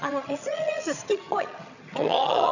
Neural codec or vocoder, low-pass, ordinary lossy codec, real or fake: vocoder, 22.05 kHz, 80 mel bands, HiFi-GAN; 7.2 kHz; none; fake